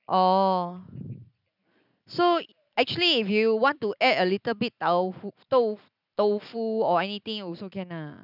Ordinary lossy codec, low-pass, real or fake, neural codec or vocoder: none; 5.4 kHz; real; none